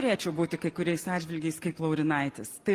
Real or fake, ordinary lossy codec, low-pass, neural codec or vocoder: real; Opus, 24 kbps; 14.4 kHz; none